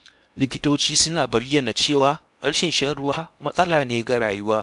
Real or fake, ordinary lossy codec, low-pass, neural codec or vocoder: fake; none; 10.8 kHz; codec, 16 kHz in and 24 kHz out, 0.8 kbps, FocalCodec, streaming, 65536 codes